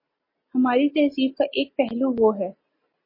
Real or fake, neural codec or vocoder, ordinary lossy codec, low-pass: real; none; MP3, 24 kbps; 5.4 kHz